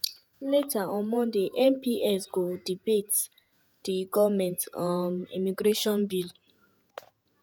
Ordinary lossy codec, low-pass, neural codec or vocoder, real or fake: none; none; vocoder, 48 kHz, 128 mel bands, Vocos; fake